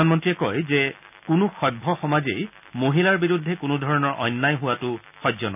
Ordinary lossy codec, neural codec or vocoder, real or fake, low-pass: none; none; real; 3.6 kHz